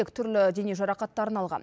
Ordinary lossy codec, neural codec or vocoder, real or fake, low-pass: none; none; real; none